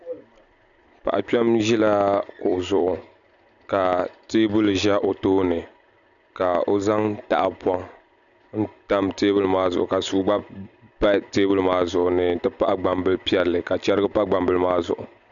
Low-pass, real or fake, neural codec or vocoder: 7.2 kHz; real; none